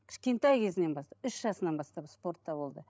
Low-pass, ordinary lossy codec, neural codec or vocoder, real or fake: none; none; codec, 16 kHz, 16 kbps, FreqCodec, larger model; fake